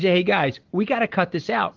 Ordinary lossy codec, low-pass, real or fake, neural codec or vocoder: Opus, 16 kbps; 7.2 kHz; real; none